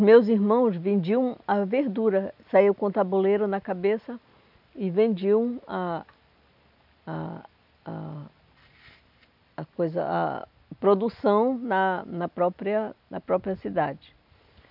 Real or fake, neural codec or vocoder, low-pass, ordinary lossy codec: real; none; 5.4 kHz; none